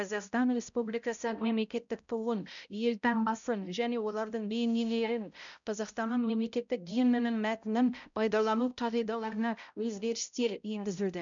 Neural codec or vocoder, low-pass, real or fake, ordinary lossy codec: codec, 16 kHz, 0.5 kbps, X-Codec, HuBERT features, trained on balanced general audio; 7.2 kHz; fake; none